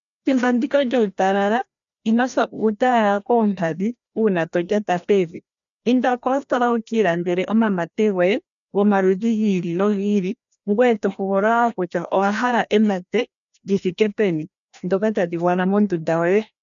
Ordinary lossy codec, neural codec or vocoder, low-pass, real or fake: MP3, 96 kbps; codec, 16 kHz, 1 kbps, FreqCodec, larger model; 7.2 kHz; fake